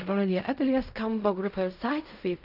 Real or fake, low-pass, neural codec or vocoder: fake; 5.4 kHz; codec, 16 kHz in and 24 kHz out, 0.4 kbps, LongCat-Audio-Codec, fine tuned four codebook decoder